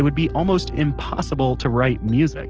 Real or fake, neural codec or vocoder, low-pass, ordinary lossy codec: real; none; 7.2 kHz; Opus, 16 kbps